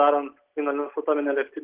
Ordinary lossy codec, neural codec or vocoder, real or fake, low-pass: Opus, 16 kbps; none; real; 3.6 kHz